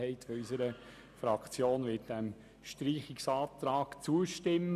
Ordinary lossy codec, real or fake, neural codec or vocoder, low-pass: none; real; none; 14.4 kHz